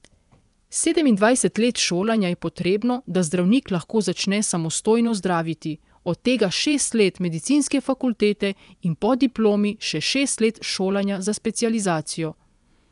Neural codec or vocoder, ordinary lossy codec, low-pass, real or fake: vocoder, 24 kHz, 100 mel bands, Vocos; none; 10.8 kHz; fake